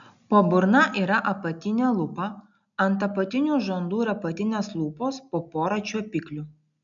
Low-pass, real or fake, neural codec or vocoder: 7.2 kHz; real; none